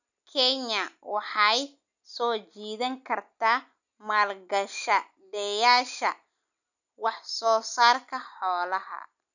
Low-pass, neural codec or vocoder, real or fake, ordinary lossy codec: 7.2 kHz; none; real; none